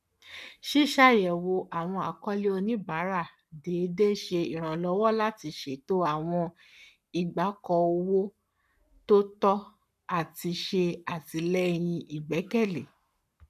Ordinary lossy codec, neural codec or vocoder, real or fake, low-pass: none; codec, 44.1 kHz, 7.8 kbps, Pupu-Codec; fake; 14.4 kHz